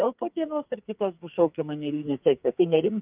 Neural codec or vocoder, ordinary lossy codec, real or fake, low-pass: codec, 44.1 kHz, 2.6 kbps, SNAC; Opus, 24 kbps; fake; 3.6 kHz